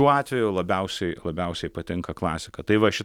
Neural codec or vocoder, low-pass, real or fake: none; 19.8 kHz; real